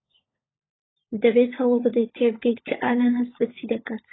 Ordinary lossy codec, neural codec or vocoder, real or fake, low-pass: AAC, 16 kbps; codec, 16 kHz, 16 kbps, FunCodec, trained on LibriTTS, 50 frames a second; fake; 7.2 kHz